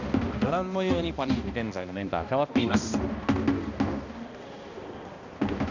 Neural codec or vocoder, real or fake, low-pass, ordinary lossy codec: codec, 16 kHz, 1 kbps, X-Codec, HuBERT features, trained on balanced general audio; fake; 7.2 kHz; none